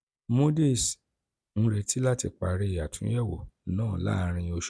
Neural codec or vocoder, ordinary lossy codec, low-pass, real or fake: none; none; none; real